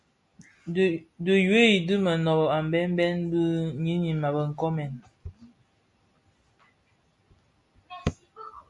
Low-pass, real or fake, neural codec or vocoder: 10.8 kHz; real; none